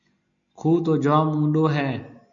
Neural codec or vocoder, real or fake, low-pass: none; real; 7.2 kHz